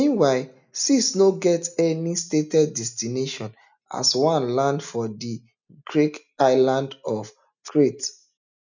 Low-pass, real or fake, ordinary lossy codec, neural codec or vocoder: 7.2 kHz; real; none; none